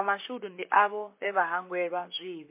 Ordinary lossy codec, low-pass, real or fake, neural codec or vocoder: MP3, 24 kbps; 3.6 kHz; fake; vocoder, 44.1 kHz, 128 mel bands, Pupu-Vocoder